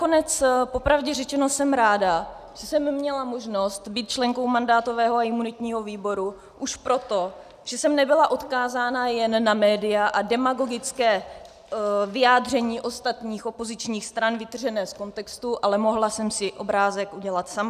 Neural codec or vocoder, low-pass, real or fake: none; 14.4 kHz; real